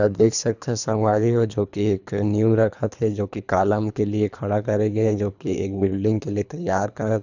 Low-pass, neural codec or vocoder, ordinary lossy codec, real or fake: 7.2 kHz; codec, 24 kHz, 3 kbps, HILCodec; none; fake